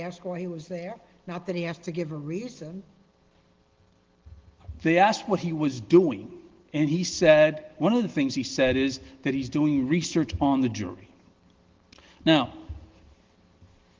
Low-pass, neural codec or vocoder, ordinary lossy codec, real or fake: 7.2 kHz; none; Opus, 16 kbps; real